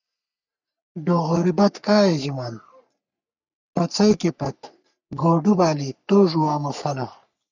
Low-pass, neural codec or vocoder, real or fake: 7.2 kHz; codec, 44.1 kHz, 3.4 kbps, Pupu-Codec; fake